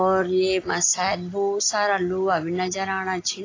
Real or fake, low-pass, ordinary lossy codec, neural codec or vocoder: real; 7.2 kHz; AAC, 32 kbps; none